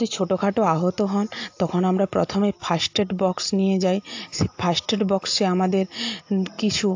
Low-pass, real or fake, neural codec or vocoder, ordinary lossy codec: 7.2 kHz; real; none; AAC, 48 kbps